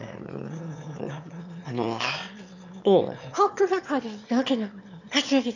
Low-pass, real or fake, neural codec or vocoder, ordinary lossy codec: 7.2 kHz; fake; autoencoder, 22.05 kHz, a latent of 192 numbers a frame, VITS, trained on one speaker; none